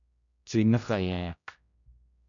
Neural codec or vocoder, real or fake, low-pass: codec, 16 kHz, 0.5 kbps, X-Codec, HuBERT features, trained on general audio; fake; 7.2 kHz